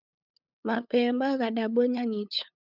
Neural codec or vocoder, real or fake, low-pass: codec, 16 kHz, 8 kbps, FunCodec, trained on LibriTTS, 25 frames a second; fake; 5.4 kHz